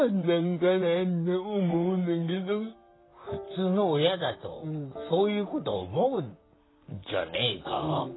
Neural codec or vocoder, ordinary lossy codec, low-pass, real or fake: vocoder, 44.1 kHz, 128 mel bands, Pupu-Vocoder; AAC, 16 kbps; 7.2 kHz; fake